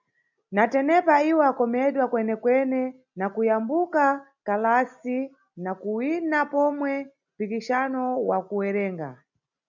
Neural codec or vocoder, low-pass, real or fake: none; 7.2 kHz; real